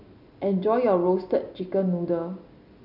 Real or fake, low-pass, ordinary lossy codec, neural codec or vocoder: real; 5.4 kHz; none; none